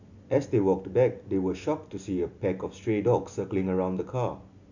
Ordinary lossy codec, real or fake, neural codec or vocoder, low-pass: none; real; none; 7.2 kHz